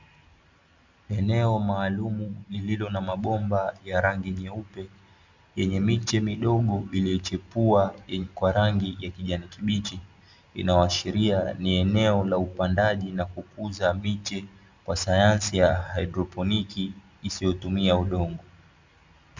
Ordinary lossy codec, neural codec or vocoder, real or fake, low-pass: Opus, 64 kbps; none; real; 7.2 kHz